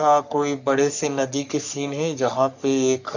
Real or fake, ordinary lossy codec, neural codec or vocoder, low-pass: fake; none; codec, 44.1 kHz, 3.4 kbps, Pupu-Codec; 7.2 kHz